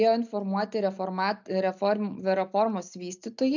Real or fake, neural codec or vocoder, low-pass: real; none; 7.2 kHz